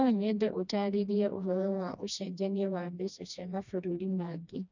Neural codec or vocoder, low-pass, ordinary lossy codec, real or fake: codec, 16 kHz, 1 kbps, FreqCodec, smaller model; 7.2 kHz; none; fake